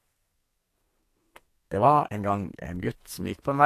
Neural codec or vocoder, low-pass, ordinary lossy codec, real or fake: codec, 44.1 kHz, 2.6 kbps, SNAC; 14.4 kHz; AAC, 64 kbps; fake